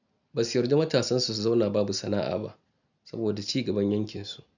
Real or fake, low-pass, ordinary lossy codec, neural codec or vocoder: real; 7.2 kHz; none; none